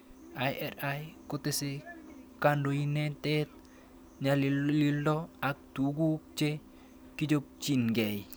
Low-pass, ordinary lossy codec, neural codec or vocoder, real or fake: none; none; none; real